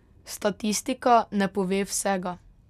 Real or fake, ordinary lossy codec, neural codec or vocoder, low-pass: real; none; none; 14.4 kHz